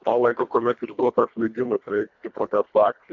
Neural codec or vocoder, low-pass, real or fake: codec, 24 kHz, 1.5 kbps, HILCodec; 7.2 kHz; fake